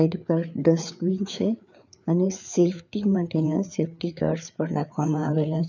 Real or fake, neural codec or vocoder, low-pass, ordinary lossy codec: fake; codec, 16 kHz, 4 kbps, FreqCodec, larger model; 7.2 kHz; none